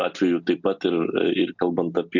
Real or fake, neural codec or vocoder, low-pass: real; none; 7.2 kHz